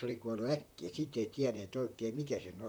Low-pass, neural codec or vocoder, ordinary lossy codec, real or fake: none; vocoder, 44.1 kHz, 128 mel bands, Pupu-Vocoder; none; fake